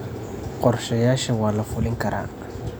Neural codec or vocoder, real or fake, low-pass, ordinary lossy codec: none; real; none; none